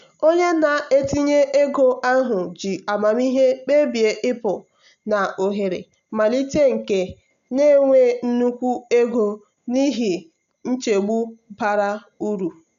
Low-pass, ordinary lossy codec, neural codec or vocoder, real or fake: 7.2 kHz; none; none; real